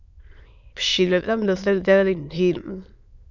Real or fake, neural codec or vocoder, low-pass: fake; autoencoder, 22.05 kHz, a latent of 192 numbers a frame, VITS, trained on many speakers; 7.2 kHz